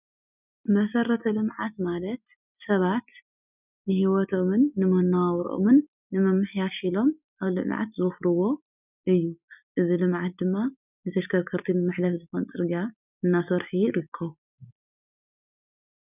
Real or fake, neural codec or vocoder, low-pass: real; none; 3.6 kHz